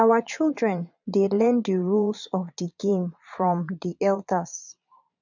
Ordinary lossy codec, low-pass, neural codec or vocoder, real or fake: none; 7.2 kHz; vocoder, 22.05 kHz, 80 mel bands, Vocos; fake